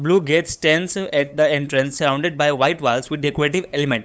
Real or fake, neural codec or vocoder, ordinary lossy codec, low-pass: fake; codec, 16 kHz, 8 kbps, FunCodec, trained on LibriTTS, 25 frames a second; none; none